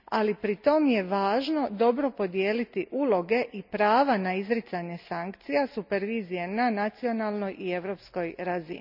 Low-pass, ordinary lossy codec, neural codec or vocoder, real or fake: 5.4 kHz; none; none; real